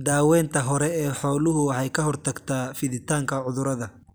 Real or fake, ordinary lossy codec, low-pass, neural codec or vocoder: real; none; none; none